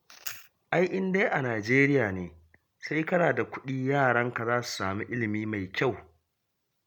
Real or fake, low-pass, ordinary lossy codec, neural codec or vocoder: real; 19.8 kHz; MP3, 96 kbps; none